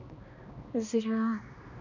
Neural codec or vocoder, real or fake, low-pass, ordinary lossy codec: codec, 16 kHz, 2 kbps, X-Codec, HuBERT features, trained on balanced general audio; fake; 7.2 kHz; none